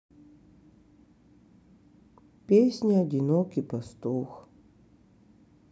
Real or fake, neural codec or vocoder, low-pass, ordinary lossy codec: real; none; none; none